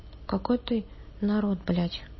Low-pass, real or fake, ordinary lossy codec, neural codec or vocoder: 7.2 kHz; real; MP3, 24 kbps; none